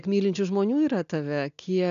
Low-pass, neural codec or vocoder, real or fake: 7.2 kHz; none; real